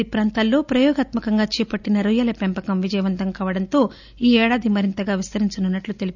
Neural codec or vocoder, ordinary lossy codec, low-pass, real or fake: none; none; 7.2 kHz; real